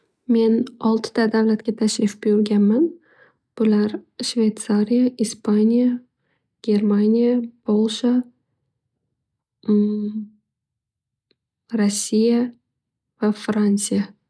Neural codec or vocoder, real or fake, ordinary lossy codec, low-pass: none; real; none; none